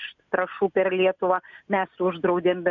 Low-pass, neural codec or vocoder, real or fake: 7.2 kHz; none; real